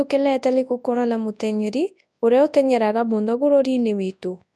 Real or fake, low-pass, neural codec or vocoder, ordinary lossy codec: fake; none; codec, 24 kHz, 0.9 kbps, WavTokenizer, large speech release; none